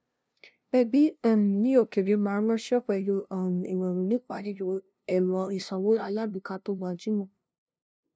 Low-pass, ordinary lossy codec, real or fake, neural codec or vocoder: none; none; fake; codec, 16 kHz, 0.5 kbps, FunCodec, trained on LibriTTS, 25 frames a second